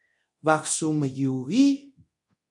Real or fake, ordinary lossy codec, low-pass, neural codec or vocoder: fake; MP3, 48 kbps; 10.8 kHz; codec, 24 kHz, 0.9 kbps, DualCodec